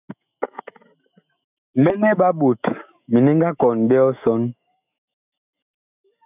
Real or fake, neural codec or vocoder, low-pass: fake; autoencoder, 48 kHz, 128 numbers a frame, DAC-VAE, trained on Japanese speech; 3.6 kHz